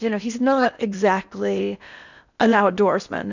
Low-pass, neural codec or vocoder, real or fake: 7.2 kHz; codec, 16 kHz in and 24 kHz out, 0.8 kbps, FocalCodec, streaming, 65536 codes; fake